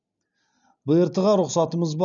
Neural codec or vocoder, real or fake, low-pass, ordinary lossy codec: none; real; 7.2 kHz; none